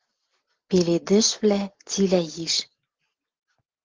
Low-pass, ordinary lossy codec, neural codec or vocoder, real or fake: 7.2 kHz; Opus, 16 kbps; none; real